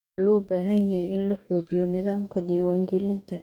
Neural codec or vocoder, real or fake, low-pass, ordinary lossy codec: codec, 44.1 kHz, 2.6 kbps, DAC; fake; 19.8 kHz; none